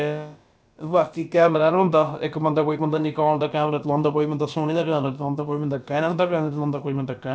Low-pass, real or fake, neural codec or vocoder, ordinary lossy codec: none; fake; codec, 16 kHz, about 1 kbps, DyCAST, with the encoder's durations; none